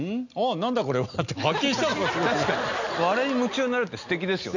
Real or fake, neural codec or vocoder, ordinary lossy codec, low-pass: real; none; none; 7.2 kHz